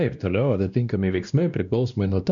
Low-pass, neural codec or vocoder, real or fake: 7.2 kHz; codec, 16 kHz, 1 kbps, X-Codec, WavLM features, trained on Multilingual LibriSpeech; fake